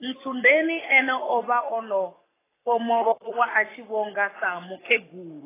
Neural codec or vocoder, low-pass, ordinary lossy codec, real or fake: codec, 44.1 kHz, 7.8 kbps, Pupu-Codec; 3.6 kHz; AAC, 16 kbps; fake